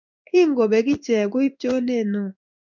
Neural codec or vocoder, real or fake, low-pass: codec, 16 kHz in and 24 kHz out, 1 kbps, XY-Tokenizer; fake; 7.2 kHz